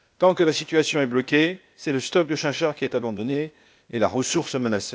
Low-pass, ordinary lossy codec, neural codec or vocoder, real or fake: none; none; codec, 16 kHz, 0.8 kbps, ZipCodec; fake